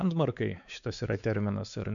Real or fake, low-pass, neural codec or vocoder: fake; 7.2 kHz; codec, 16 kHz, 4 kbps, X-Codec, WavLM features, trained on Multilingual LibriSpeech